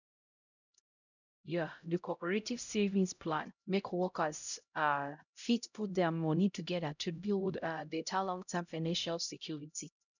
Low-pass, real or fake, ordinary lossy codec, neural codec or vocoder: 7.2 kHz; fake; none; codec, 16 kHz, 0.5 kbps, X-Codec, HuBERT features, trained on LibriSpeech